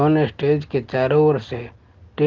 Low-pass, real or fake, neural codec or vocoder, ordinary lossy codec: 7.2 kHz; real; none; Opus, 16 kbps